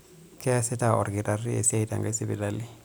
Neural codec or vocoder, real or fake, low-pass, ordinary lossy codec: none; real; none; none